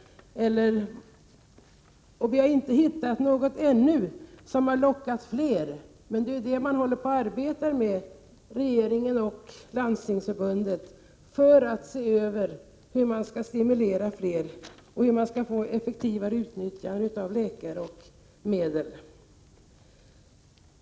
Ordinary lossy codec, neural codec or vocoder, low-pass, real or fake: none; none; none; real